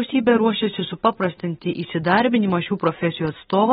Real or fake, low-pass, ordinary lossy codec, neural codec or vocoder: real; 10.8 kHz; AAC, 16 kbps; none